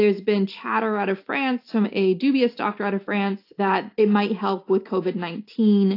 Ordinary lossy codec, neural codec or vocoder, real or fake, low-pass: AAC, 32 kbps; none; real; 5.4 kHz